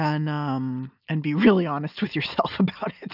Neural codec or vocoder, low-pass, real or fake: none; 5.4 kHz; real